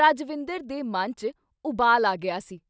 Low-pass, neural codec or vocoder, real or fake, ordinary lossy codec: none; none; real; none